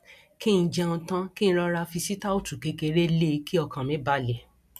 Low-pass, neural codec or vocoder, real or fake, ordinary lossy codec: 14.4 kHz; none; real; MP3, 96 kbps